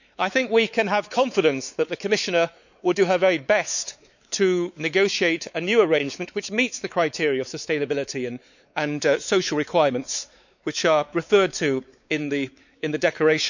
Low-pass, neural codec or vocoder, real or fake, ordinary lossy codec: 7.2 kHz; codec, 16 kHz, 4 kbps, X-Codec, WavLM features, trained on Multilingual LibriSpeech; fake; none